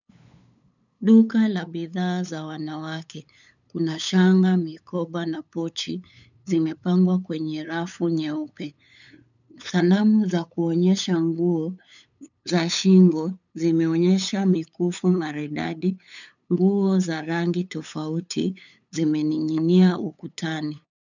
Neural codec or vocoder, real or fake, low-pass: codec, 16 kHz, 8 kbps, FunCodec, trained on LibriTTS, 25 frames a second; fake; 7.2 kHz